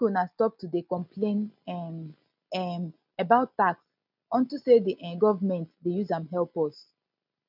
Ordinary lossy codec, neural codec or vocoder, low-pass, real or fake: none; none; 5.4 kHz; real